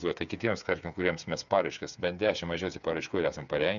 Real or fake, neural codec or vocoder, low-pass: fake; codec, 16 kHz, 8 kbps, FreqCodec, smaller model; 7.2 kHz